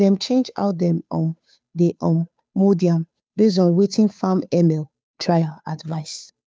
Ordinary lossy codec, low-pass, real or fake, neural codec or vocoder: none; none; fake; codec, 16 kHz, 2 kbps, FunCodec, trained on Chinese and English, 25 frames a second